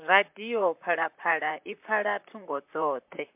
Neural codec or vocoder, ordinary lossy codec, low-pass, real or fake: vocoder, 44.1 kHz, 128 mel bands, Pupu-Vocoder; MP3, 32 kbps; 3.6 kHz; fake